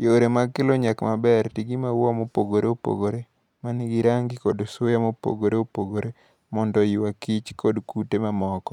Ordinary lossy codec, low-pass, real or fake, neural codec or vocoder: none; 19.8 kHz; real; none